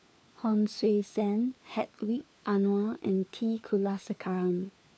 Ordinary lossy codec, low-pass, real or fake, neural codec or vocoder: none; none; fake; codec, 16 kHz, 4 kbps, FunCodec, trained on LibriTTS, 50 frames a second